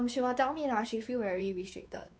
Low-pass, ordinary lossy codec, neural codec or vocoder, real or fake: none; none; codec, 16 kHz, 4 kbps, X-Codec, WavLM features, trained on Multilingual LibriSpeech; fake